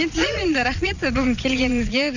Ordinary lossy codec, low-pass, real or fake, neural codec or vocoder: none; 7.2 kHz; fake; vocoder, 22.05 kHz, 80 mel bands, Vocos